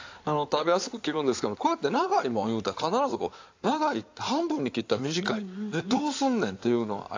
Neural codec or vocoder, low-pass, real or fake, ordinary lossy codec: codec, 16 kHz in and 24 kHz out, 2.2 kbps, FireRedTTS-2 codec; 7.2 kHz; fake; AAC, 48 kbps